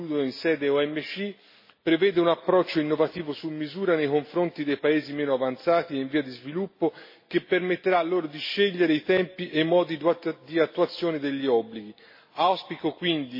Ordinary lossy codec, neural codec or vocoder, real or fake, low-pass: MP3, 24 kbps; none; real; 5.4 kHz